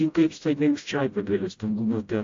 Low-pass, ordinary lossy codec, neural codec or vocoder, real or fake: 7.2 kHz; AAC, 48 kbps; codec, 16 kHz, 0.5 kbps, FreqCodec, smaller model; fake